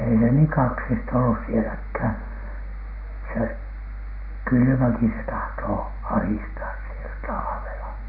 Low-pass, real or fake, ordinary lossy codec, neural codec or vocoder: 5.4 kHz; real; none; none